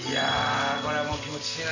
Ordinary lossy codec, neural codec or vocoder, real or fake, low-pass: none; none; real; 7.2 kHz